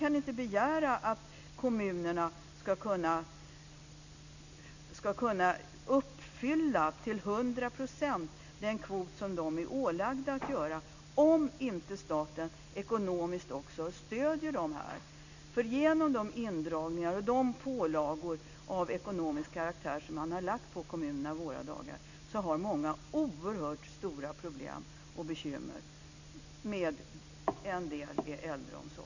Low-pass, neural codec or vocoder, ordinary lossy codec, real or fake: 7.2 kHz; none; none; real